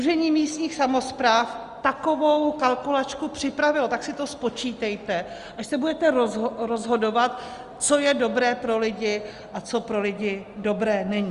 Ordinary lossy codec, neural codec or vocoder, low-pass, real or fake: Opus, 32 kbps; none; 10.8 kHz; real